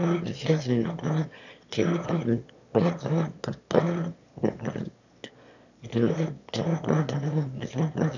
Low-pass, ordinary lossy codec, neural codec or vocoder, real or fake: 7.2 kHz; none; autoencoder, 22.05 kHz, a latent of 192 numbers a frame, VITS, trained on one speaker; fake